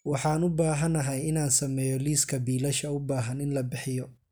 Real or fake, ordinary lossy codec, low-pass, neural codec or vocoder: real; none; none; none